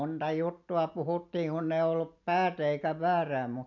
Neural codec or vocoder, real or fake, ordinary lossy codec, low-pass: none; real; none; 7.2 kHz